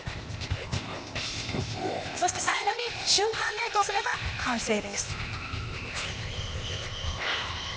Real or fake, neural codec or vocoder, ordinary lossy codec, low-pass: fake; codec, 16 kHz, 0.8 kbps, ZipCodec; none; none